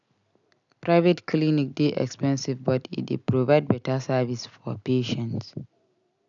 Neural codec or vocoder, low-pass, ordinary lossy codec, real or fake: none; 7.2 kHz; none; real